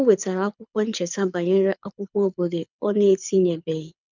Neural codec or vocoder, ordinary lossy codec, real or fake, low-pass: codec, 24 kHz, 6 kbps, HILCodec; none; fake; 7.2 kHz